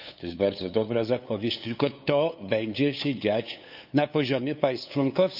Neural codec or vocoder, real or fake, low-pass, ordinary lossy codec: codec, 16 kHz, 8 kbps, FunCodec, trained on LibriTTS, 25 frames a second; fake; 5.4 kHz; none